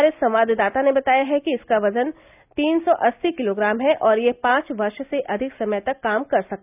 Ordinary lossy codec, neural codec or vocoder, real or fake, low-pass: none; none; real; 3.6 kHz